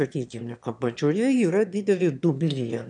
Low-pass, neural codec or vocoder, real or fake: 9.9 kHz; autoencoder, 22.05 kHz, a latent of 192 numbers a frame, VITS, trained on one speaker; fake